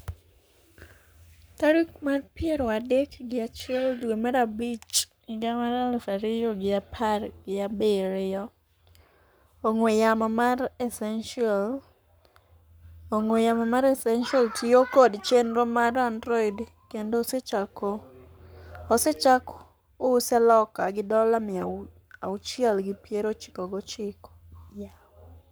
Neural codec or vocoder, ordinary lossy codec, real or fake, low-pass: codec, 44.1 kHz, 7.8 kbps, Pupu-Codec; none; fake; none